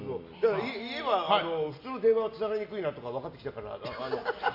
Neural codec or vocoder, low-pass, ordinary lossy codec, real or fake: none; 5.4 kHz; Opus, 64 kbps; real